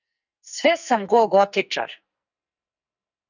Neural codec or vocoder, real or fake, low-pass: codec, 44.1 kHz, 2.6 kbps, SNAC; fake; 7.2 kHz